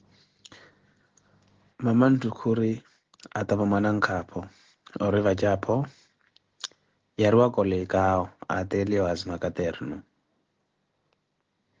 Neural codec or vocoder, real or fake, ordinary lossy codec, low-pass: none; real; Opus, 16 kbps; 7.2 kHz